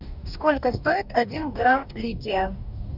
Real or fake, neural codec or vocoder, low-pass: fake; codec, 44.1 kHz, 2.6 kbps, DAC; 5.4 kHz